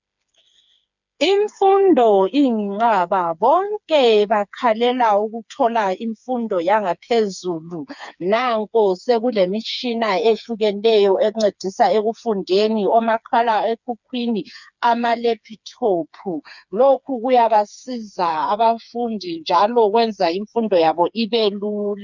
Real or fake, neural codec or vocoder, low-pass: fake; codec, 16 kHz, 4 kbps, FreqCodec, smaller model; 7.2 kHz